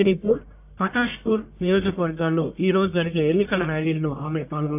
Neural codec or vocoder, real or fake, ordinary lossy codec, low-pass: codec, 44.1 kHz, 1.7 kbps, Pupu-Codec; fake; AAC, 32 kbps; 3.6 kHz